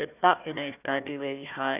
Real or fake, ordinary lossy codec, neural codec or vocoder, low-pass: fake; none; codec, 16 kHz, 1 kbps, FunCodec, trained on Chinese and English, 50 frames a second; 3.6 kHz